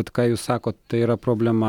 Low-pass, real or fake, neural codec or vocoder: 19.8 kHz; real; none